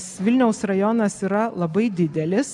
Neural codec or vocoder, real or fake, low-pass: none; real; 10.8 kHz